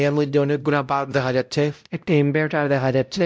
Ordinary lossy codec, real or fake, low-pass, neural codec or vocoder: none; fake; none; codec, 16 kHz, 0.5 kbps, X-Codec, WavLM features, trained on Multilingual LibriSpeech